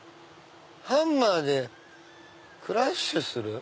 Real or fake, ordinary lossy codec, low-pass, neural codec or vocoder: real; none; none; none